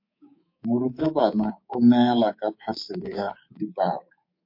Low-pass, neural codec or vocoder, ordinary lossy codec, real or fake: 5.4 kHz; codec, 24 kHz, 3.1 kbps, DualCodec; MP3, 32 kbps; fake